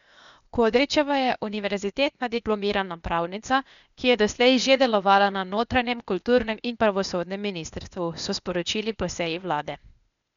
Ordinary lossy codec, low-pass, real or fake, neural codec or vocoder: none; 7.2 kHz; fake; codec, 16 kHz, 0.8 kbps, ZipCodec